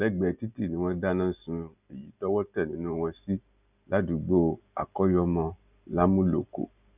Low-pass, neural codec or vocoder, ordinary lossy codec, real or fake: 3.6 kHz; none; none; real